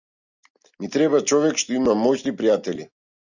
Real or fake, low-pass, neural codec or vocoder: real; 7.2 kHz; none